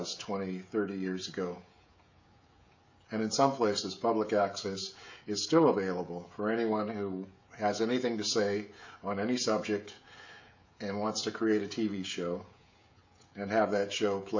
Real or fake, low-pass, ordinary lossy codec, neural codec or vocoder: fake; 7.2 kHz; MP3, 64 kbps; codec, 16 kHz, 16 kbps, FreqCodec, smaller model